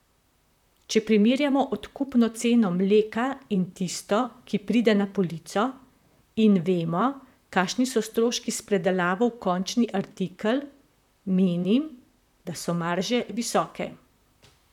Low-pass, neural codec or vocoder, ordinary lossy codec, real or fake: 19.8 kHz; vocoder, 44.1 kHz, 128 mel bands, Pupu-Vocoder; none; fake